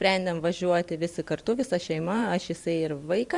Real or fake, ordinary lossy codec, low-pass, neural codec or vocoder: fake; Opus, 64 kbps; 10.8 kHz; vocoder, 44.1 kHz, 128 mel bands every 512 samples, BigVGAN v2